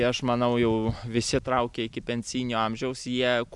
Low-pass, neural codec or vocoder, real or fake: 10.8 kHz; none; real